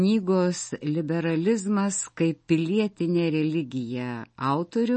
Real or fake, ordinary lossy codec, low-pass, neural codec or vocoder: real; MP3, 32 kbps; 10.8 kHz; none